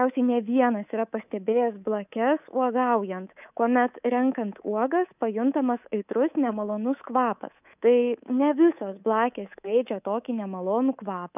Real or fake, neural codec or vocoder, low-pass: fake; codec, 24 kHz, 3.1 kbps, DualCodec; 3.6 kHz